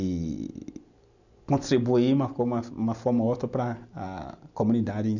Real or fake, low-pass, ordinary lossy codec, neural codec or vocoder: real; 7.2 kHz; none; none